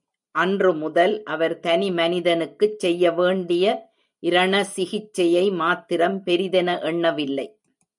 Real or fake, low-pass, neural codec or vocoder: real; 10.8 kHz; none